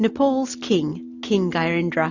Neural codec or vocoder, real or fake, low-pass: none; real; 7.2 kHz